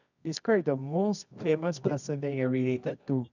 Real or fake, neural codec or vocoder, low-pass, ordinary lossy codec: fake; codec, 24 kHz, 0.9 kbps, WavTokenizer, medium music audio release; 7.2 kHz; none